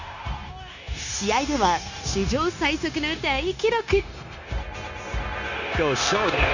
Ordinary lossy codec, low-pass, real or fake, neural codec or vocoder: none; 7.2 kHz; fake; codec, 16 kHz, 0.9 kbps, LongCat-Audio-Codec